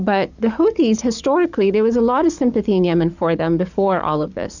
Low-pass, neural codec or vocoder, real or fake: 7.2 kHz; codec, 44.1 kHz, 7.8 kbps, DAC; fake